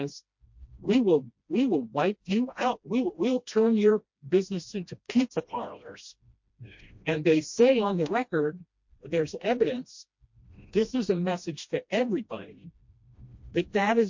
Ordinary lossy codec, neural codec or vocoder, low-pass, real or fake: MP3, 48 kbps; codec, 16 kHz, 1 kbps, FreqCodec, smaller model; 7.2 kHz; fake